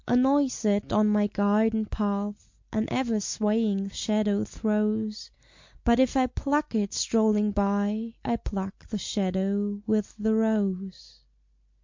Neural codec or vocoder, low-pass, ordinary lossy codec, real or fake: none; 7.2 kHz; MP3, 48 kbps; real